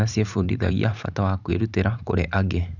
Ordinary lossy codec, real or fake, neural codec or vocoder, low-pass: none; fake; vocoder, 44.1 kHz, 128 mel bands every 512 samples, BigVGAN v2; 7.2 kHz